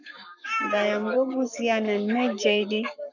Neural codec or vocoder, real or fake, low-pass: codec, 44.1 kHz, 7.8 kbps, Pupu-Codec; fake; 7.2 kHz